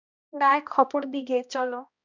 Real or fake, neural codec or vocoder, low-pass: fake; codec, 16 kHz, 1 kbps, X-Codec, HuBERT features, trained on balanced general audio; 7.2 kHz